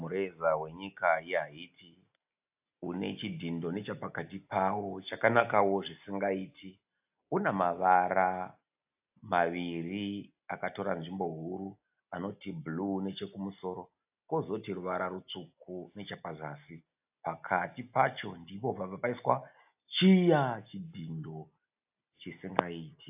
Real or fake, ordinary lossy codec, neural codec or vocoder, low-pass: real; AAC, 32 kbps; none; 3.6 kHz